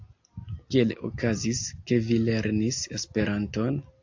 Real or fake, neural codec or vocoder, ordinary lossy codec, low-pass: real; none; AAC, 48 kbps; 7.2 kHz